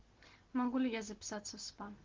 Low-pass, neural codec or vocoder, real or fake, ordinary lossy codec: 7.2 kHz; none; real; Opus, 16 kbps